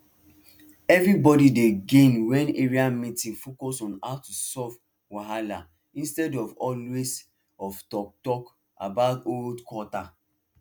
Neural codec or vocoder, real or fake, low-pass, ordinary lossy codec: none; real; none; none